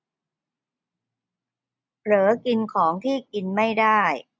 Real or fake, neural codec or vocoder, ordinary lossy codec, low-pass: real; none; none; none